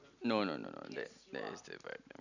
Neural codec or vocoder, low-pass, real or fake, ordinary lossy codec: none; 7.2 kHz; real; none